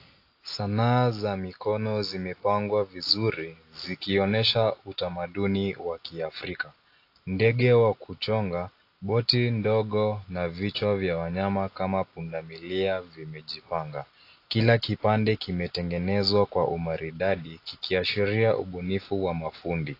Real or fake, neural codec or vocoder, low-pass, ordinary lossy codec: real; none; 5.4 kHz; AAC, 32 kbps